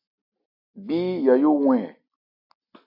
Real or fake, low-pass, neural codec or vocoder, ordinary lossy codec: real; 5.4 kHz; none; AAC, 48 kbps